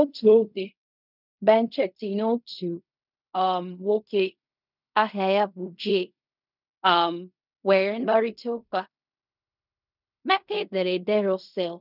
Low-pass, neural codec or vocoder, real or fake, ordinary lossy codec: 5.4 kHz; codec, 16 kHz in and 24 kHz out, 0.4 kbps, LongCat-Audio-Codec, fine tuned four codebook decoder; fake; none